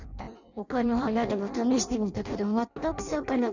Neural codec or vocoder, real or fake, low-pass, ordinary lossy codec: codec, 16 kHz in and 24 kHz out, 0.6 kbps, FireRedTTS-2 codec; fake; 7.2 kHz; none